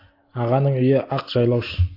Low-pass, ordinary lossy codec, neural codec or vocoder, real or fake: 5.4 kHz; AAC, 32 kbps; none; real